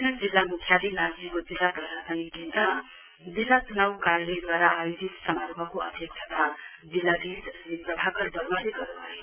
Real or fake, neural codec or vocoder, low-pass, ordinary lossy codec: fake; vocoder, 22.05 kHz, 80 mel bands, Vocos; 3.6 kHz; none